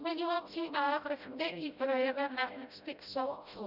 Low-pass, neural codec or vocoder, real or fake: 5.4 kHz; codec, 16 kHz, 0.5 kbps, FreqCodec, smaller model; fake